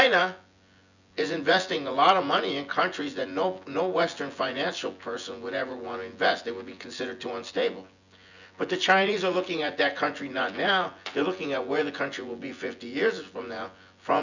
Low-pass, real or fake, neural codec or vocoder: 7.2 kHz; fake; vocoder, 24 kHz, 100 mel bands, Vocos